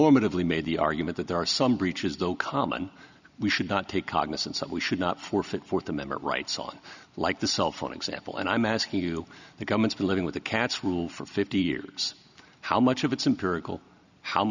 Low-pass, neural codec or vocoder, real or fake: 7.2 kHz; none; real